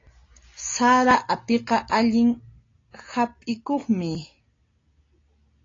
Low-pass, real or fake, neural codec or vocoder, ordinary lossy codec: 7.2 kHz; real; none; AAC, 32 kbps